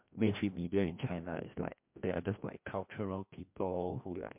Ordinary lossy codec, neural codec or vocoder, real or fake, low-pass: MP3, 32 kbps; codec, 16 kHz, 1 kbps, FreqCodec, larger model; fake; 3.6 kHz